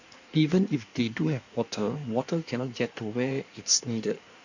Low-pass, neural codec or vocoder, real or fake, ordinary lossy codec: 7.2 kHz; codec, 16 kHz in and 24 kHz out, 1.1 kbps, FireRedTTS-2 codec; fake; none